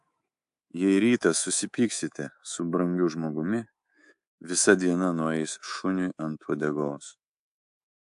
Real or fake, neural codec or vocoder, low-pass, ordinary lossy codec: fake; codec, 24 kHz, 3.1 kbps, DualCodec; 10.8 kHz; AAC, 64 kbps